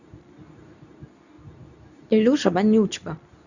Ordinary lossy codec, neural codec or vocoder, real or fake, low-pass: none; codec, 24 kHz, 0.9 kbps, WavTokenizer, medium speech release version 2; fake; 7.2 kHz